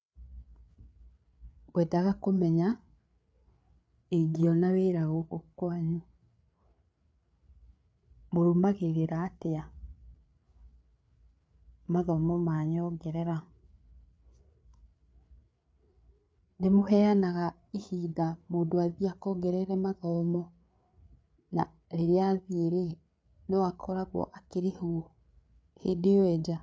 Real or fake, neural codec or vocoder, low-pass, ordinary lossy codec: fake; codec, 16 kHz, 8 kbps, FreqCodec, larger model; none; none